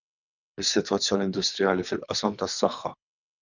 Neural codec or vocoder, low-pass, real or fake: codec, 24 kHz, 3 kbps, HILCodec; 7.2 kHz; fake